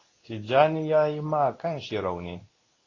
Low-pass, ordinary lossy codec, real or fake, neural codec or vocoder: 7.2 kHz; AAC, 32 kbps; fake; codec, 24 kHz, 0.9 kbps, WavTokenizer, medium speech release version 2